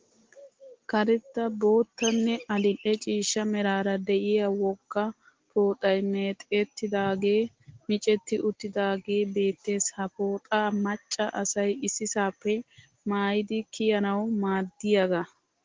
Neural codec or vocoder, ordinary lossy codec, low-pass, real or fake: none; Opus, 16 kbps; 7.2 kHz; real